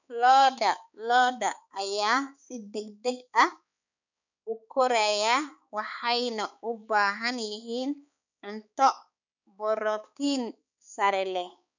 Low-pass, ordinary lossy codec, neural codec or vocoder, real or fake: 7.2 kHz; none; codec, 16 kHz, 4 kbps, X-Codec, HuBERT features, trained on balanced general audio; fake